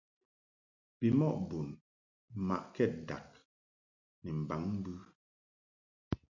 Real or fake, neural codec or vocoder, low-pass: real; none; 7.2 kHz